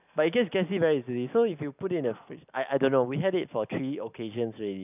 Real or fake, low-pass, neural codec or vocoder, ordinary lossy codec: real; 3.6 kHz; none; none